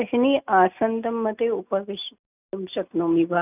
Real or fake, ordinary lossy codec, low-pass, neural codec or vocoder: real; none; 3.6 kHz; none